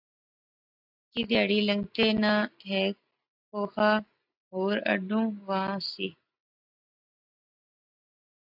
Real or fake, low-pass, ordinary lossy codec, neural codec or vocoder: real; 5.4 kHz; AAC, 48 kbps; none